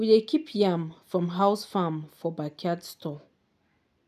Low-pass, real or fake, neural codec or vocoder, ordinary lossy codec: 14.4 kHz; real; none; none